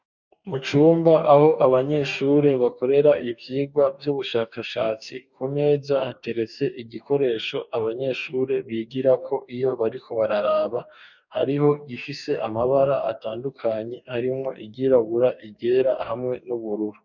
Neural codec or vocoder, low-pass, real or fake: codec, 44.1 kHz, 2.6 kbps, DAC; 7.2 kHz; fake